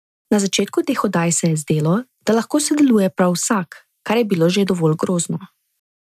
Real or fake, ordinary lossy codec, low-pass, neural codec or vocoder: real; none; 14.4 kHz; none